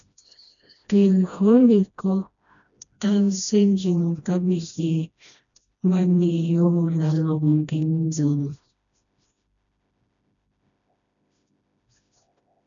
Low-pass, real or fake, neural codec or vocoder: 7.2 kHz; fake; codec, 16 kHz, 1 kbps, FreqCodec, smaller model